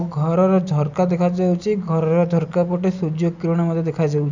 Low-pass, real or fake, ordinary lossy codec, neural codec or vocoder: 7.2 kHz; real; none; none